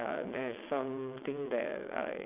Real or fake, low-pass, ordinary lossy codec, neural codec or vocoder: fake; 3.6 kHz; none; vocoder, 22.05 kHz, 80 mel bands, WaveNeXt